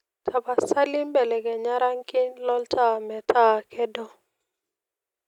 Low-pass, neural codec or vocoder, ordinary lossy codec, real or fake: 19.8 kHz; none; none; real